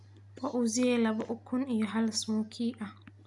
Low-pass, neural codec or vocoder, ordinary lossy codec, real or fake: 10.8 kHz; none; none; real